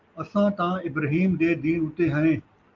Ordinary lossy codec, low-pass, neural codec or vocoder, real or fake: Opus, 32 kbps; 7.2 kHz; none; real